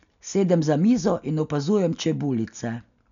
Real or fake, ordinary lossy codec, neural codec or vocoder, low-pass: real; none; none; 7.2 kHz